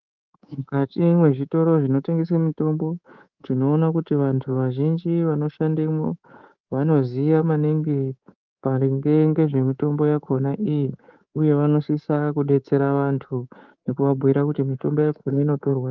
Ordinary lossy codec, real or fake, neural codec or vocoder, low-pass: Opus, 24 kbps; fake; codec, 24 kHz, 3.1 kbps, DualCodec; 7.2 kHz